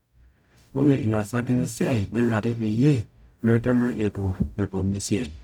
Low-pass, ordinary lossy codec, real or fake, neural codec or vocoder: 19.8 kHz; none; fake; codec, 44.1 kHz, 0.9 kbps, DAC